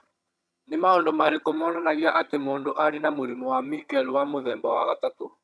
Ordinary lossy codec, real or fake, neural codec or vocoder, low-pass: none; fake; vocoder, 22.05 kHz, 80 mel bands, HiFi-GAN; none